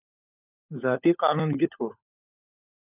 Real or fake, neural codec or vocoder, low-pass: fake; codec, 16 kHz, 16 kbps, FunCodec, trained on LibriTTS, 50 frames a second; 3.6 kHz